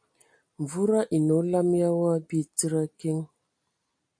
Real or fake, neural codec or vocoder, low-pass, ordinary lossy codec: real; none; 9.9 kHz; MP3, 48 kbps